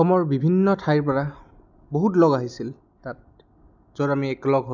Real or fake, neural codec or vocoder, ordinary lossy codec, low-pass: real; none; none; 7.2 kHz